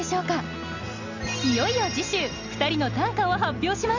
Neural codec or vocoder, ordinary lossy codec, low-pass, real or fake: none; none; 7.2 kHz; real